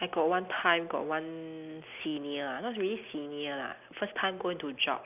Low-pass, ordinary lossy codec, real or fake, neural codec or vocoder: 3.6 kHz; none; real; none